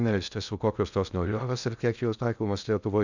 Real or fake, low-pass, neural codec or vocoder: fake; 7.2 kHz; codec, 16 kHz in and 24 kHz out, 0.6 kbps, FocalCodec, streaming, 2048 codes